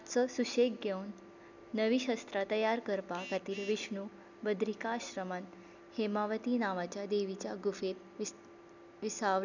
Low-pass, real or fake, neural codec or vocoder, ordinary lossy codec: 7.2 kHz; real; none; none